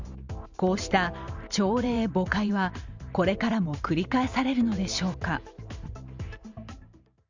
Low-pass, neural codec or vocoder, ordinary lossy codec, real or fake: 7.2 kHz; vocoder, 44.1 kHz, 128 mel bands every 512 samples, BigVGAN v2; Opus, 64 kbps; fake